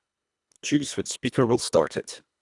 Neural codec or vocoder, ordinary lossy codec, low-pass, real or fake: codec, 24 kHz, 1.5 kbps, HILCodec; none; 10.8 kHz; fake